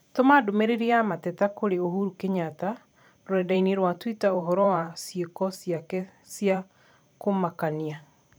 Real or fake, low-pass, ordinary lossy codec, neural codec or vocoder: fake; none; none; vocoder, 44.1 kHz, 128 mel bands every 512 samples, BigVGAN v2